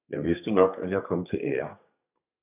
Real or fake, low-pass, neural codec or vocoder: fake; 3.6 kHz; codec, 32 kHz, 1.9 kbps, SNAC